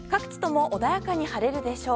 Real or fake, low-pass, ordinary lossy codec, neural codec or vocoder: real; none; none; none